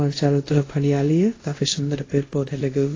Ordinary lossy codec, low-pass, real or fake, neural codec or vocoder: AAC, 32 kbps; 7.2 kHz; fake; codec, 24 kHz, 0.5 kbps, DualCodec